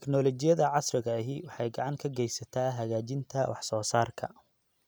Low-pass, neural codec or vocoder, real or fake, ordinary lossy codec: none; none; real; none